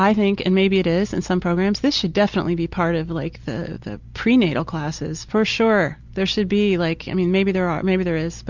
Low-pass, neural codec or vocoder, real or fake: 7.2 kHz; none; real